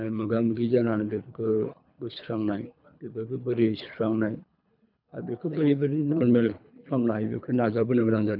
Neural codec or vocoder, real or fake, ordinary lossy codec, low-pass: codec, 24 kHz, 3 kbps, HILCodec; fake; none; 5.4 kHz